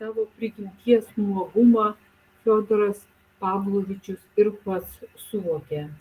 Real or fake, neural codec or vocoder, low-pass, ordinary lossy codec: real; none; 14.4 kHz; Opus, 24 kbps